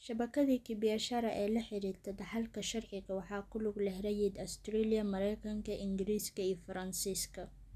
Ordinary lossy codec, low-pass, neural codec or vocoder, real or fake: none; 14.4 kHz; none; real